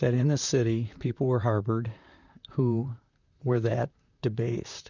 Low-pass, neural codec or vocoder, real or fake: 7.2 kHz; vocoder, 44.1 kHz, 128 mel bands, Pupu-Vocoder; fake